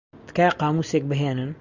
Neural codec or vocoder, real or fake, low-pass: none; real; 7.2 kHz